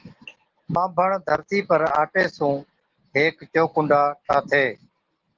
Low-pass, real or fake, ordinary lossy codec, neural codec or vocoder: 7.2 kHz; real; Opus, 16 kbps; none